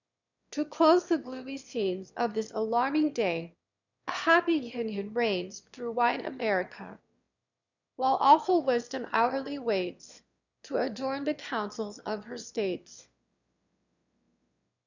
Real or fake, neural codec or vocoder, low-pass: fake; autoencoder, 22.05 kHz, a latent of 192 numbers a frame, VITS, trained on one speaker; 7.2 kHz